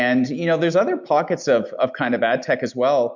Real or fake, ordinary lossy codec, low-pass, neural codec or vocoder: real; MP3, 64 kbps; 7.2 kHz; none